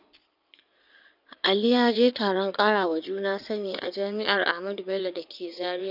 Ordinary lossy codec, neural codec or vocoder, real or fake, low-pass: none; codec, 16 kHz in and 24 kHz out, 2.2 kbps, FireRedTTS-2 codec; fake; 5.4 kHz